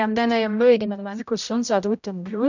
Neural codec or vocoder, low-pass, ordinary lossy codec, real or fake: codec, 16 kHz, 0.5 kbps, X-Codec, HuBERT features, trained on general audio; 7.2 kHz; none; fake